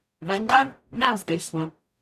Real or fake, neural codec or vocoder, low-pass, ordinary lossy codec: fake; codec, 44.1 kHz, 0.9 kbps, DAC; 14.4 kHz; none